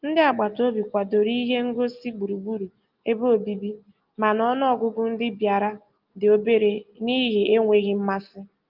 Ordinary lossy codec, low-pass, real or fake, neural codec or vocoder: Opus, 32 kbps; 5.4 kHz; real; none